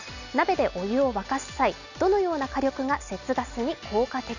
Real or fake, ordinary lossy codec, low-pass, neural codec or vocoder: real; none; 7.2 kHz; none